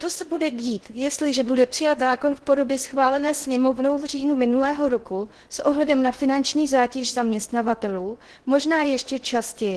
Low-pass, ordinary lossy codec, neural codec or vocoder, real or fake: 10.8 kHz; Opus, 16 kbps; codec, 16 kHz in and 24 kHz out, 0.6 kbps, FocalCodec, streaming, 2048 codes; fake